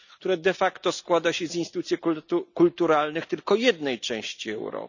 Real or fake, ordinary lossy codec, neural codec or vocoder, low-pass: real; none; none; 7.2 kHz